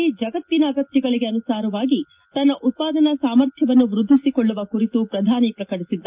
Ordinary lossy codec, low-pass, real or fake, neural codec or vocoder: Opus, 32 kbps; 3.6 kHz; real; none